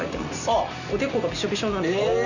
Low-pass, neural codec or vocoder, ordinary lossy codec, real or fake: 7.2 kHz; none; none; real